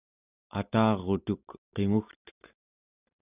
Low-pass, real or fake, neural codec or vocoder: 3.6 kHz; real; none